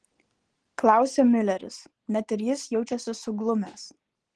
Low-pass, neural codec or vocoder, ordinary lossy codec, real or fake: 9.9 kHz; none; Opus, 16 kbps; real